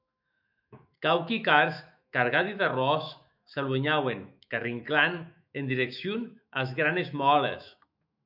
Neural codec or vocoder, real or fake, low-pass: autoencoder, 48 kHz, 128 numbers a frame, DAC-VAE, trained on Japanese speech; fake; 5.4 kHz